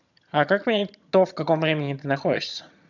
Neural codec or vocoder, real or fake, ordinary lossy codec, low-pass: vocoder, 22.05 kHz, 80 mel bands, HiFi-GAN; fake; none; 7.2 kHz